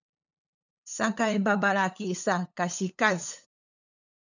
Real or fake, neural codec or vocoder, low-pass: fake; codec, 16 kHz, 8 kbps, FunCodec, trained on LibriTTS, 25 frames a second; 7.2 kHz